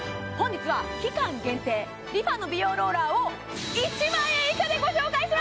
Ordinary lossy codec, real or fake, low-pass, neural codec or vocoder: none; real; none; none